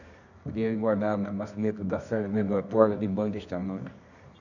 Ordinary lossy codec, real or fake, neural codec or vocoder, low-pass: none; fake; codec, 24 kHz, 0.9 kbps, WavTokenizer, medium music audio release; 7.2 kHz